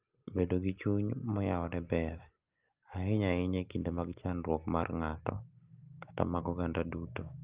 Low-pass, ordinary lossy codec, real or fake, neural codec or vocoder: 3.6 kHz; Opus, 24 kbps; real; none